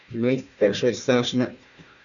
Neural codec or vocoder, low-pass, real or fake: codec, 16 kHz, 1 kbps, FunCodec, trained on Chinese and English, 50 frames a second; 7.2 kHz; fake